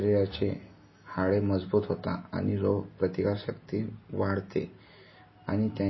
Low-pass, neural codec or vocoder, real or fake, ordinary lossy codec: 7.2 kHz; none; real; MP3, 24 kbps